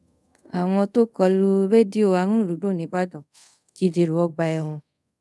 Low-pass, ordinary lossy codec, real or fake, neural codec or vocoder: none; none; fake; codec, 24 kHz, 0.5 kbps, DualCodec